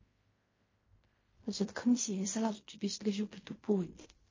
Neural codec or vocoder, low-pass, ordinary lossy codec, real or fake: codec, 16 kHz in and 24 kHz out, 0.4 kbps, LongCat-Audio-Codec, fine tuned four codebook decoder; 7.2 kHz; MP3, 32 kbps; fake